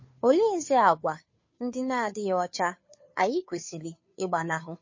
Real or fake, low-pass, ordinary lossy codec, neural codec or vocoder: fake; 7.2 kHz; MP3, 32 kbps; codec, 16 kHz, 2 kbps, FunCodec, trained on Chinese and English, 25 frames a second